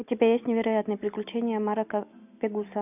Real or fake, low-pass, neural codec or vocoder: real; 3.6 kHz; none